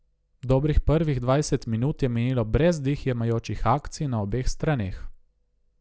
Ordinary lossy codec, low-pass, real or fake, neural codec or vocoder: none; none; real; none